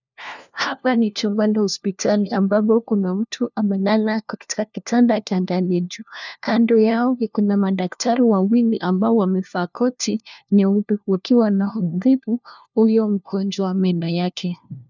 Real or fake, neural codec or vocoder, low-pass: fake; codec, 16 kHz, 1 kbps, FunCodec, trained on LibriTTS, 50 frames a second; 7.2 kHz